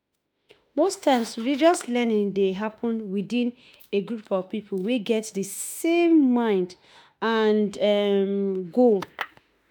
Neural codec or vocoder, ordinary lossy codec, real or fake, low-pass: autoencoder, 48 kHz, 32 numbers a frame, DAC-VAE, trained on Japanese speech; none; fake; none